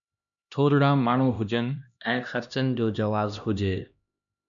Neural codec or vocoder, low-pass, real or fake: codec, 16 kHz, 1 kbps, X-Codec, HuBERT features, trained on LibriSpeech; 7.2 kHz; fake